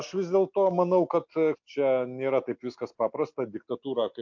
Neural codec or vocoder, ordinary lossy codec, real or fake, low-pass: none; MP3, 48 kbps; real; 7.2 kHz